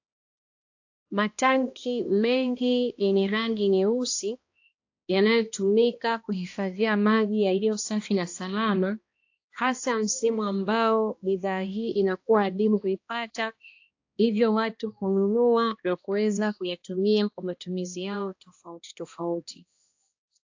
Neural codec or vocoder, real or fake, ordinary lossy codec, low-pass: codec, 16 kHz, 1 kbps, X-Codec, HuBERT features, trained on balanced general audio; fake; AAC, 48 kbps; 7.2 kHz